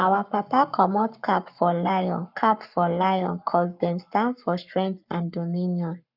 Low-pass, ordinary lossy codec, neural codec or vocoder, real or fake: 5.4 kHz; none; codec, 44.1 kHz, 7.8 kbps, Pupu-Codec; fake